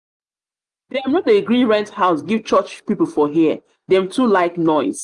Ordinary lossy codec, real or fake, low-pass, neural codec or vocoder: Opus, 32 kbps; real; 10.8 kHz; none